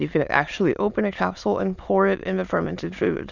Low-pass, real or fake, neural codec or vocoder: 7.2 kHz; fake; autoencoder, 22.05 kHz, a latent of 192 numbers a frame, VITS, trained on many speakers